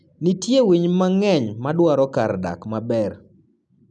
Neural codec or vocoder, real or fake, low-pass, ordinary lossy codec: none; real; 10.8 kHz; none